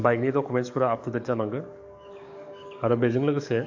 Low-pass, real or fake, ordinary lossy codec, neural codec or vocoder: 7.2 kHz; fake; AAC, 48 kbps; codec, 44.1 kHz, 7.8 kbps, DAC